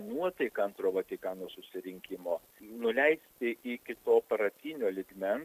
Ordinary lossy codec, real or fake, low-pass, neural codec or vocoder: AAC, 96 kbps; real; 14.4 kHz; none